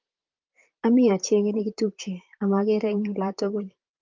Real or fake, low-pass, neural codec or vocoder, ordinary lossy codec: fake; 7.2 kHz; vocoder, 44.1 kHz, 128 mel bands, Pupu-Vocoder; Opus, 32 kbps